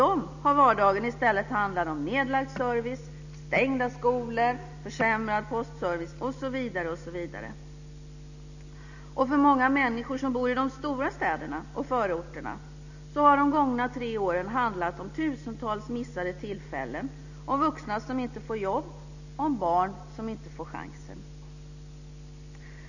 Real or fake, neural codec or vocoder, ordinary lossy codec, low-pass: real; none; none; 7.2 kHz